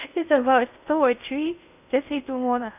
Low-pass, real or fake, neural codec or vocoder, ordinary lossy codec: 3.6 kHz; fake; codec, 16 kHz in and 24 kHz out, 0.6 kbps, FocalCodec, streaming, 2048 codes; none